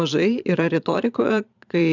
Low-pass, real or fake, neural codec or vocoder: 7.2 kHz; fake; vocoder, 22.05 kHz, 80 mel bands, Vocos